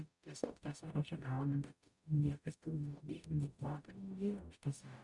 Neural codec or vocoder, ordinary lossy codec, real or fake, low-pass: codec, 44.1 kHz, 0.9 kbps, DAC; MP3, 96 kbps; fake; 10.8 kHz